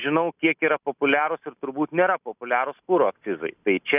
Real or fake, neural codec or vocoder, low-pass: real; none; 3.6 kHz